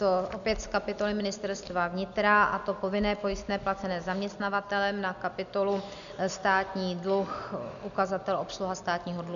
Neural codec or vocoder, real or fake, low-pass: none; real; 7.2 kHz